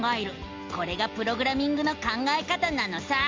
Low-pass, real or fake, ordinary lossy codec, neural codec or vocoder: 7.2 kHz; real; Opus, 32 kbps; none